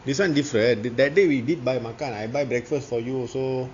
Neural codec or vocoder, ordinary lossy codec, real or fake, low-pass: none; AAC, 48 kbps; real; 7.2 kHz